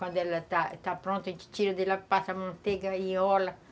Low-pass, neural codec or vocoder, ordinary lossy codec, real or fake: none; none; none; real